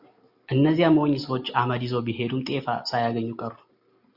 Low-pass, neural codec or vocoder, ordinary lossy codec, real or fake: 5.4 kHz; none; Opus, 64 kbps; real